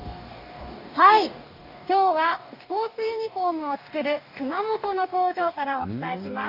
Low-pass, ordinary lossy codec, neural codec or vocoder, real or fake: 5.4 kHz; none; codec, 44.1 kHz, 2.6 kbps, DAC; fake